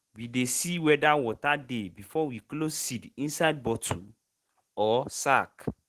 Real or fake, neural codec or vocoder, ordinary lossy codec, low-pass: real; none; Opus, 16 kbps; 14.4 kHz